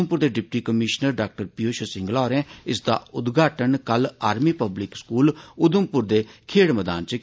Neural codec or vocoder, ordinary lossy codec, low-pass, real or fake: none; none; none; real